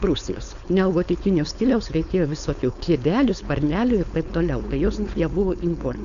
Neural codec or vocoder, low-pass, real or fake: codec, 16 kHz, 4.8 kbps, FACodec; 7.2 kHz; fake